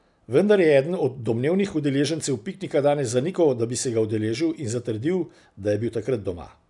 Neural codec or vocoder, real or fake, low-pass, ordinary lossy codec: none; real; 10.8 kHz; none